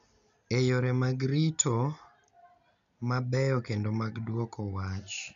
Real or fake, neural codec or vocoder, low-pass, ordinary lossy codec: real; none; 7.2 kHz; none